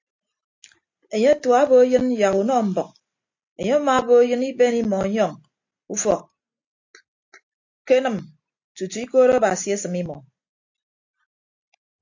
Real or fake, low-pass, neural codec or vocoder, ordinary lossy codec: real; 7.2 kHz; none; AAC, 48 kbps